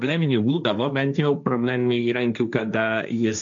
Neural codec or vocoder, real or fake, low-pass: codec, 16 kHz, 1.1 kbps, Voila-Tokenizer; fake; 7.2 kHz